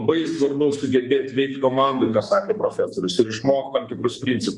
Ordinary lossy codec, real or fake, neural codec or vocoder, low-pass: Opus, 64 kbps; fake; codec, 32 kHz, 1.9 kbps, SNAC; 10.8 kHz